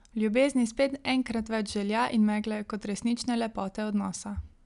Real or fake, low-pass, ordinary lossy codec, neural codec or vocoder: real; 10.8 kHz; none; none